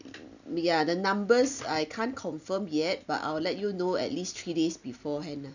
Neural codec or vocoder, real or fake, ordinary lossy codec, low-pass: none; real; none; 7.2 kHz